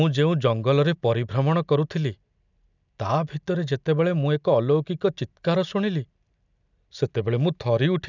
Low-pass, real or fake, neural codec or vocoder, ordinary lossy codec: 7.2 kHz; real; none; none